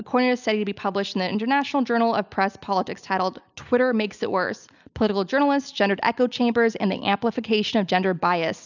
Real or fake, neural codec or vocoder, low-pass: real; none; 7.2 kHz